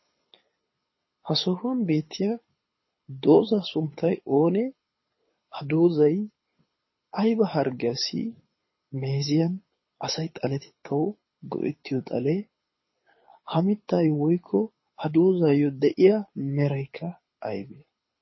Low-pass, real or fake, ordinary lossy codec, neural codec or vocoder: 7.2 kHz; fake; MP3, 24 kbps; codec, 24 kHz, 6 kbps, HILCodec